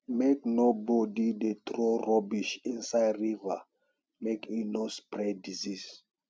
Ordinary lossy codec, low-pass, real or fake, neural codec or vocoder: none; none; real; none